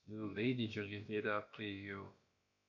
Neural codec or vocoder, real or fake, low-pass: codec, 16 kHz, about 1 kbps, DyCAST, with the encoder's durations; fake; 7.2 kHz